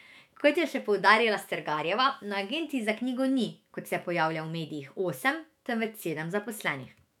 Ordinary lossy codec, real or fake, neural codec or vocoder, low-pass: none; fake; autoencoder, 48 kHz, 128 numbers a frame, DAC-VAE, trained on Japanese speech; 19.8 kHz